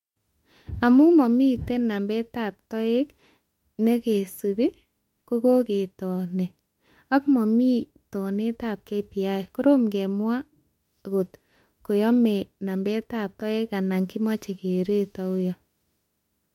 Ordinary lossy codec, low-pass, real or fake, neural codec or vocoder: MP3, 64 kbps; 19.8 kHz; fake; autoencoder, 48 kHz, 32 numbers a frame, DAC-VAE, trained on Japanese speech